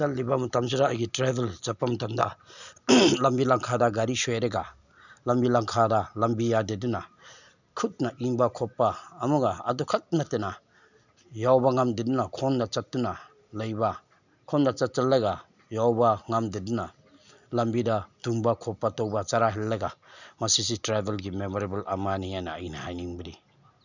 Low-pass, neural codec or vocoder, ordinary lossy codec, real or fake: 7.2 kHz; none; none; real